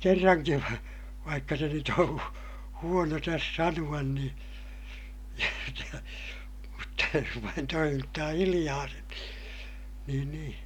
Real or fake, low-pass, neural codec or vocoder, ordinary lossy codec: real; 19.8 kHz; none; none